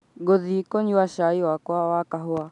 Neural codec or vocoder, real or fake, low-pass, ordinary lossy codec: none; real; 10.8 kHz; none